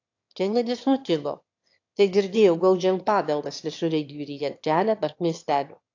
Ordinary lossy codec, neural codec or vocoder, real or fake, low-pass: AAC, 48 kbps; autoencoder, 22.05 kHz, a latent of 192 numbers a frame, VITS, trained on one speaker; fake; 7.2 kHz